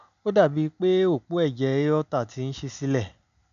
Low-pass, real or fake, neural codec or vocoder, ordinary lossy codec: 7.2 kHz; real; none; none